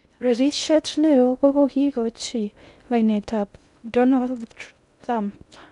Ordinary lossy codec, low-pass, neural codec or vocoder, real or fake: none; 10.8 kHz; codec, 16 kHz in and 24 kHz out, 0.6 kbps, FocalCodec, streaming, 2048 codes; fake